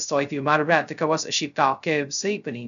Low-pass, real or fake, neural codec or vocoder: 7.2 kHz; fake; codec, 16 kHz, 0.2 kbps, FocalCodec